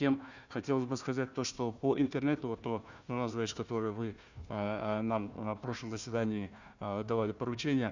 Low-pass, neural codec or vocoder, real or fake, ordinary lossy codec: 7.2 kHz; codec, 16 kHz, 1 kbps, FunCodec, trained on Chinese and English, 50 frames a second; fake; none